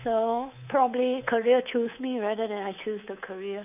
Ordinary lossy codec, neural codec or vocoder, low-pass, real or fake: none; codec, 24 kHz, 3.1 kbps, DualCodec; 3.6 kHz; fake